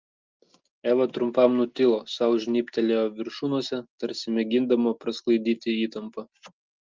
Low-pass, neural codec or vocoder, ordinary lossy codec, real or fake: 7.2 kHz; none; Opus, 32 kbps; real